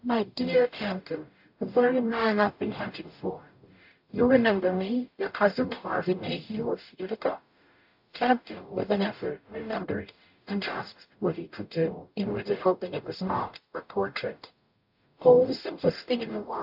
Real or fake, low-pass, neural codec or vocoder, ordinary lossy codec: fake; 5.4 kHz; codec, 44.1 kHz, 0.9 kbps, DAC; AAC, 48 kbps